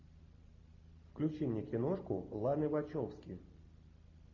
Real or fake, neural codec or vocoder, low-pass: real; none; 7.2 kHz